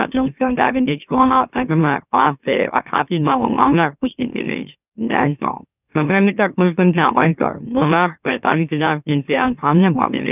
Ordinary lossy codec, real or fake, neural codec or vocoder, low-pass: none; fake; autoencoder, 44.1 kHz, a latent of 192 numbers a frame, MeloTTS; 3.6 kHz